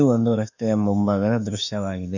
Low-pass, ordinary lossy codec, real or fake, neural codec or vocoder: 7.2 kHz; none; fake; autoencoder, 48 kHz, 32 numbers a frame, DAC-VAE, trained on Japanese speech